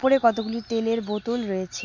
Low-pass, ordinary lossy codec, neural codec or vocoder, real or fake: 7.2 kHz; MP3, 48 kbps; none; real